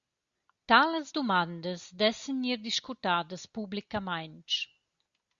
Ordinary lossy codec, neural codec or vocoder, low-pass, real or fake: Opus, 64 kbps; none; 7.2 kHz; real